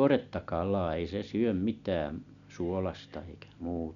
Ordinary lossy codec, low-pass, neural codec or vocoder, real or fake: none; 7.2 kHz; none; real